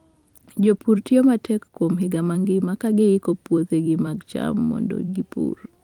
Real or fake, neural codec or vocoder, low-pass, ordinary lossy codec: fake; vocoder, 44.1 kHz, 128 mel bands every 256 samples, BigVGAN v2; 19.8 kHz; Opus, 24 kbps